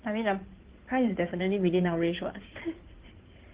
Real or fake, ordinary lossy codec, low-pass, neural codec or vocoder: fake; Opus, 16 kbps; 3.6 kHz; codec, 16 kHz in and 24 kHz out, 2.2 kbps, FireRedTTS-2 codec